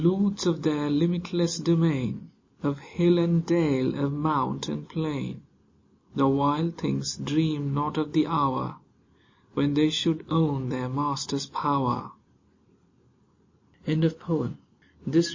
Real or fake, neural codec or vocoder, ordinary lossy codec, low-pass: real; none; MP3, 32 kbps; 7.2 kHz